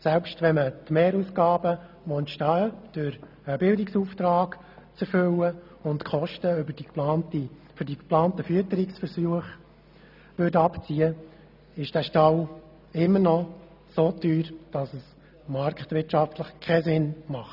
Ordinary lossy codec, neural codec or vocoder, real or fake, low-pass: none; none; real; 5.4 kHz